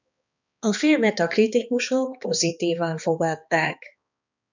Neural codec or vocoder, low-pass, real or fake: codec, 16 kHz, 2 kbps, X-Codec, HuBERT features, trained on balanced general audio; 7.2 kHz; fake